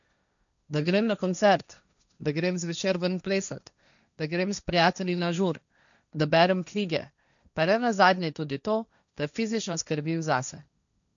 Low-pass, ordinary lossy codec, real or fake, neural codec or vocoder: 7.2 kHz; none; fake; codec, 16 kHz, 1.1 kbps, Voila-Tokenizer